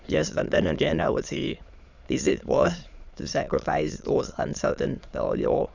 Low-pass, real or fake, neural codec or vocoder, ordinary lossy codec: 7.2 kHz; fake; autoencoder, 22.05 kHz, a latent of 192 numbers a frame, VITS, trained on many speakers; none